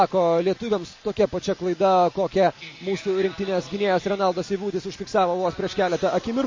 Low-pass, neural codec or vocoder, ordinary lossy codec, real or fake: 7.2 kHz; none; MP3, 32 kbps; real